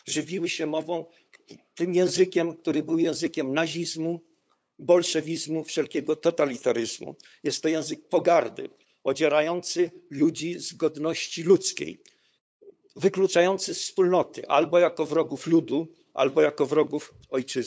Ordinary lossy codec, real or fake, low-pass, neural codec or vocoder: none; fake; none; codec, 16 kHz, 8 kbps, FunCodec, trained on LibriTTS, 25 frames a second